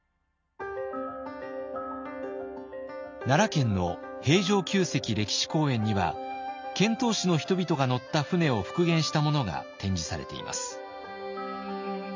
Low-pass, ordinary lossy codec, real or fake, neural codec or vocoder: 7.2 kHz; MP3, 64 kbps; real; none